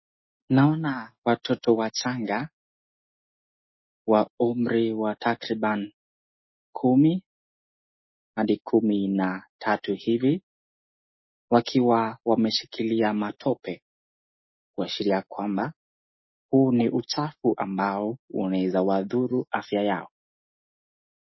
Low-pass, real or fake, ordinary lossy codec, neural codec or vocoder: 7.2 kHz; real; MP3, 24 kbps; none